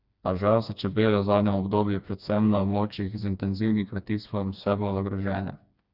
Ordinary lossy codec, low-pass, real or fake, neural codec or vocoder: Opus, 64 kbps; 5.4 kHz; fake; codec, 16 kHz, 2 kbps, FreqCodec, smaller model